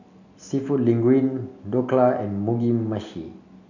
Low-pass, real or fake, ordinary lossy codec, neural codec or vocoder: 7.2 kHz; real; none; none